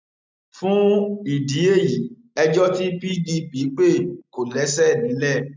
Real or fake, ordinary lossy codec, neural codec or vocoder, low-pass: real; MP3, 64 kbps; none; 7.2 kHz